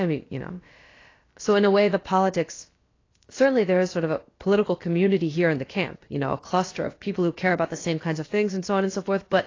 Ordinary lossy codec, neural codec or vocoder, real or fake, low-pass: AAC, 32 kbps; codec, 16 kHz, about 1 kbps, DyCAST, with the encoder's durations; fake; 7.2 kHz